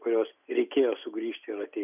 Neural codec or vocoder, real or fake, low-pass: none; real; 3.6 kHz